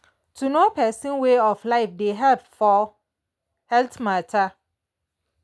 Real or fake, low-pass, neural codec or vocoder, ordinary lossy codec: real; none; none; none